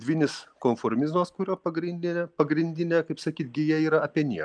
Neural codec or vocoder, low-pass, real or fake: none; 9.9 kHz; real